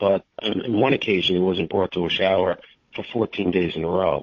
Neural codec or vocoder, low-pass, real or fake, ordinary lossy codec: codec, 16 kHz, 16 kbps, FunCodec, trained on Chinese and English, 50 frames a second; 7.2 kHz; fake; MP3, 32 kbps